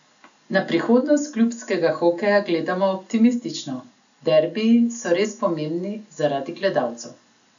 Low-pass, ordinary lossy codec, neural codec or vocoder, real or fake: 7.2 kHz; none; none; real